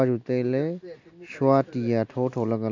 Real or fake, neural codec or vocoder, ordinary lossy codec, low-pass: real; none; MP3, 64 kbps; 7.2 kHz